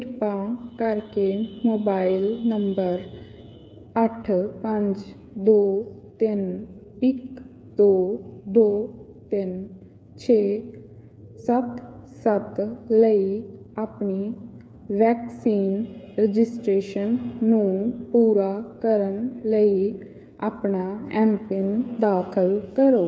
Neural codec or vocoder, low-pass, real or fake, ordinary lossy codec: codec, 16 kHz, 8 kbps, FreqCodec, smaller model; none; fake; none